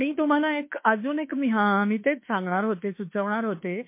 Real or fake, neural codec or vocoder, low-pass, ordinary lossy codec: fake; autoencoder, 48 kHz, 32 numbers a frame, DAC-VAE, trained on Japanese speech; 3.6 kHz; MP3, 24 kbps